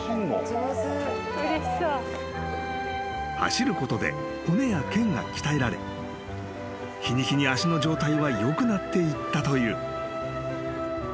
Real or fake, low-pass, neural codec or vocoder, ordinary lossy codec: real; none; none; none